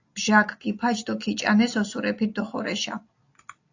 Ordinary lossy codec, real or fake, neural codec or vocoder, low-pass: AAC, 48 kbps; real; none; 7.2 kHz